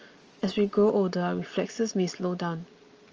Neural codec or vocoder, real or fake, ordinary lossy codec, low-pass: none; real; Opus, 24 kbps; 7.2 kHz